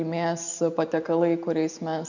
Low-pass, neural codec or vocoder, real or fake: 7.2 kHz; none; real